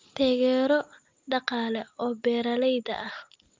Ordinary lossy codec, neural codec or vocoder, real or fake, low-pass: Opus, 24 kbps; none; real; 7.2 kHz